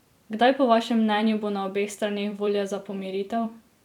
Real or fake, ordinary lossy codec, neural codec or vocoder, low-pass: fake; none; vocoder, 44.1 kHz, 128 mel bands every 256 samples, BigVGAN v2; 19.8 kHz